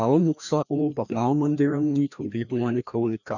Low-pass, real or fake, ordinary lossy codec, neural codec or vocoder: 7.2 kHz; fake; none; codec, 16 kHz, 1 kbps, FreqCodec, larger model